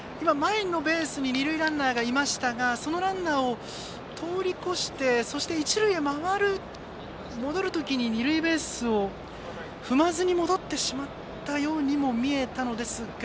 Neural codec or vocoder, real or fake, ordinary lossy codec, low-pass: none; real; none; none